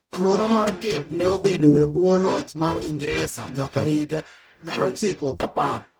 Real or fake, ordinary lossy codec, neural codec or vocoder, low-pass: fake; none; codec, 44.1 kHz, 0.9 kbps, DAC; none